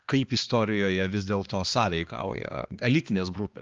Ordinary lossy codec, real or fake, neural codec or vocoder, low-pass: Opus, 32 kbps; fake; codec, 16 kHz, 2 kbps, X-Codec, HuBERT features, trained on balanced general audio; 7.2 kHz